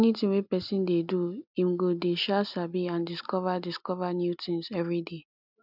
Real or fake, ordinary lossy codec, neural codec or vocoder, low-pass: real; none; none; 5.4 kHz